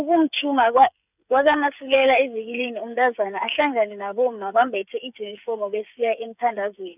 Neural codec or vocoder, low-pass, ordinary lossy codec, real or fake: codec, 16 kHz, 8 kbps, FreqCodec, smaller model; 3.6 kHz; none; fake